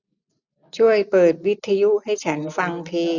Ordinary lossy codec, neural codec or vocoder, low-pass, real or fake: none; none; 7.2 kHz; real